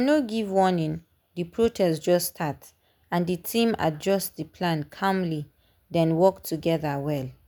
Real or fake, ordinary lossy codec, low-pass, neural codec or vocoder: real; none; none; none